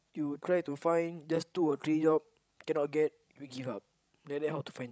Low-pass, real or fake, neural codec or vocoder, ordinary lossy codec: none; fake; codec, 16 kHz, 16 kbps, FreqCodec, larger model; none